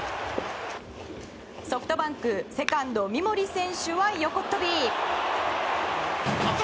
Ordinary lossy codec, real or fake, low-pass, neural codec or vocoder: none; real; none; none